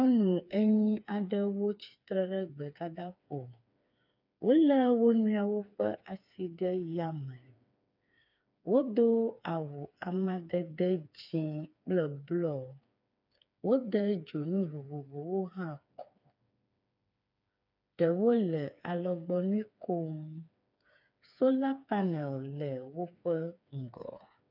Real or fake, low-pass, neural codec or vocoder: fake; 5.4 kHz; codec, 16 kHz, 4 kbps, FreqCodec, smaller model